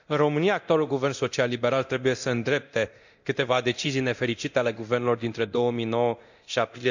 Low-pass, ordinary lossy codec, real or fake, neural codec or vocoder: 7.2 kHz; none; fake; codec, 24 kHz, 0.9 kbps, DualCodec